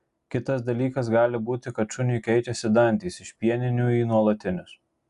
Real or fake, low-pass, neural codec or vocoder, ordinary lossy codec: real; 10.8 kHz; none; Opus, 64 kbps